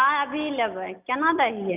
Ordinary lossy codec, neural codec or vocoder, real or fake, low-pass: none; none; real; 3.6 kHz